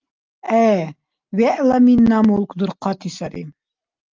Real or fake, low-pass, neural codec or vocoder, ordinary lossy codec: real; 7.2 kHz; none; Opus, 24 kbps